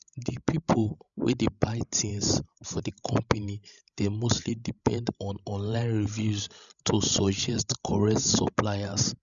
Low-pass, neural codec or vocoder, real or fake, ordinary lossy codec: 7.2 kHz; codec, 16 kHz, 16 kbps, FreqCodec, larger model; fake; none